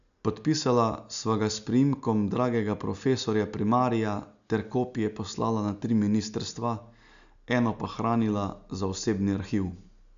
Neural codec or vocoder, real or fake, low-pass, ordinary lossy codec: none; real; 7.2 kHz; none